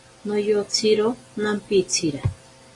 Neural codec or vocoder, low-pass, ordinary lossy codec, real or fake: none; 10.8 kHz; AAC, 32 kbps; real